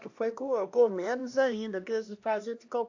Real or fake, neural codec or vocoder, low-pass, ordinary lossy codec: fake; codec, 16 kHz, 1 kbps, X-Codec, HuBERT features, trained on LibriSpeech; 7.2 kHz; AAC, 48 kbps